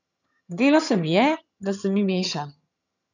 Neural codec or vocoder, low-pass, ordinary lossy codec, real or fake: vocoder, 22.05 kHz, 80 mel bands, HiFi-GAN; 7.2 kHz; none; fake